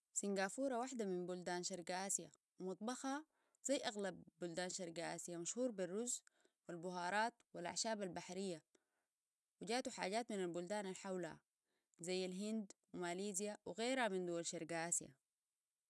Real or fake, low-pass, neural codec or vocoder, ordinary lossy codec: real; none; none; none